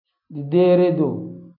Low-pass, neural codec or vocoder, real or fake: 5.4 kHz; none; real